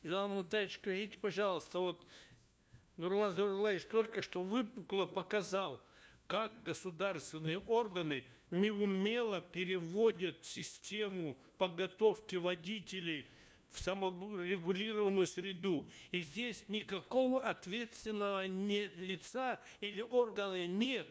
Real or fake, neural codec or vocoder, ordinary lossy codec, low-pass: fake; codec, 16 kHz, 1 kbps, FunCodec, trained on LibriTTS, 50 frames a second; none; none